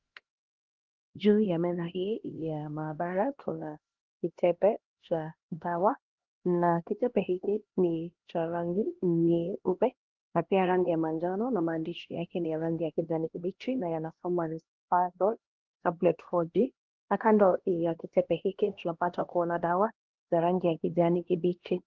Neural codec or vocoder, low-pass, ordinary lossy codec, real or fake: codec, 16 kHz, 1 kbps, X-Codec, HuBERT features, trained on LibriSpeech; 7.2 kHz; Opus, 16 kbps; fake